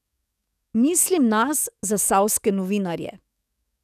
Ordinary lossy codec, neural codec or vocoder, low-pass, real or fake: none; codec, 44.1 kHz, 7.8 kbps, DAC; 14.4 kHz; fake